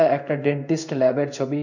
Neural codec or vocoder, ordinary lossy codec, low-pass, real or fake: codec, 16 kHz in and 24 kHz out, 1 kbps, XY-Tokenizer; none; 7.2 kHz; fake